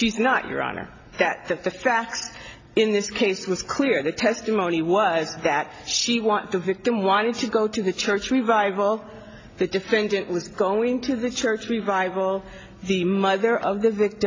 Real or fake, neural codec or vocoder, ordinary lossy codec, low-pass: real; none; AAC, 32 kbps; 7.2 kHz